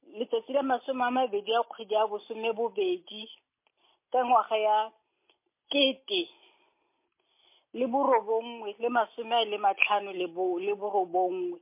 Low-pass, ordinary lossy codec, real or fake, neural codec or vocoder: 3.6 kHz; MP3, 24 kbps; real; none